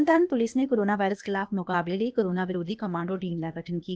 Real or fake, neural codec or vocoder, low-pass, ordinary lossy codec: fake; codec, 16 kHz, 0.8 kbps, ZipCodec; none; none